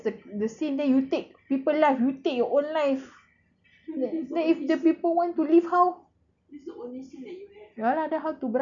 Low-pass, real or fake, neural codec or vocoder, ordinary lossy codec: 7.2 kHz; real; none; MP3, 96 kbps